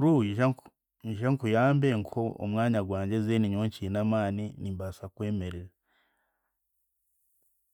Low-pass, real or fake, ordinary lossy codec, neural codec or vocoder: 19.8 kHz; real; none; none